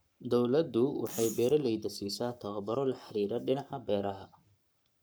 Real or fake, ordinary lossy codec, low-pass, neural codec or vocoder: fake; none; none; codec, 44.1 kHz, 7.8 kbps, Pupu-Codec